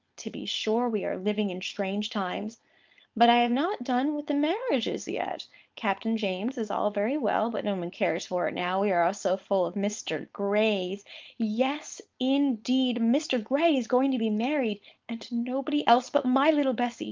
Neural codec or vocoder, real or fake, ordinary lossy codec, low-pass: codec, 16 kHz, 4.8 kbps, FACodec; fake; Opus, 24 kbps; 7.2 kHz